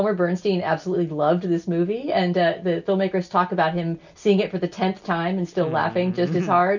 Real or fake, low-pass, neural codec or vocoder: real; 7.2 kHz; none